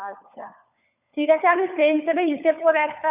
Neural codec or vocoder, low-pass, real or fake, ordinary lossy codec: codec, 16 kHz, 4 kbps, FunCodec, trained on LibriTTS, 50 frames a second; 3.6 kHz; fake; none